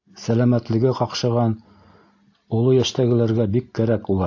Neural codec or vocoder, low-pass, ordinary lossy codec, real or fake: none; 7.2 kHz; AAC, 48 kbps; real